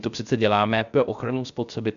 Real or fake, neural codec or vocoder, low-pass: fake; codec, 16 kHz, 0.3 kbps, FocalCodec; 7.2 kHz